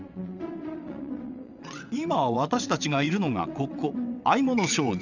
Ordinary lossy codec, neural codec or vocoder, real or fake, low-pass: none; vocoder, 22.05 kHz, 80 mel bands, WaveNeXt; fake; 7.2 kHz